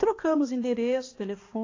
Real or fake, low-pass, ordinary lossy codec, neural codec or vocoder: fake; 7.2 kHz; AAC, 32 kbps; codec, 16 kHz, 2 kbps, X-Codec, HuBERT features, trained on balanced general audio